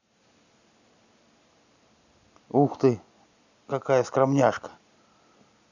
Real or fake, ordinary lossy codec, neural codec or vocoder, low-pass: real; none; none; 7.2 kHz